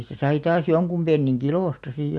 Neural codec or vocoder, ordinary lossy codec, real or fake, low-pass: none; none; real; none